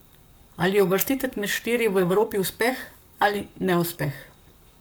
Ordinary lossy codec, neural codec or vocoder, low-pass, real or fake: none; codec, 44.1 kHz, 7.8 kbps, Pupu-Codec; none; fake